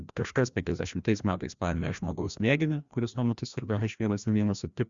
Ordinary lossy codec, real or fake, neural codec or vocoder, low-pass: Opus, 64 kbps; fake; codec, 16 kHz, 1 kbps, FreqCodec, larger model; 7.2 kHz